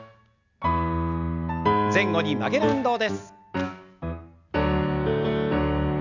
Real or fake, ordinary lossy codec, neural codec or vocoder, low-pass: real; none; none; 7.2 kHz